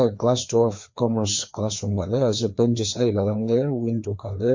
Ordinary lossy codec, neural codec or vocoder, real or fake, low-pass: MP3, 48 kbps; codec, 16 kHz, 2 kbps, FreqCodec, larger model; fake; 7.2 kHz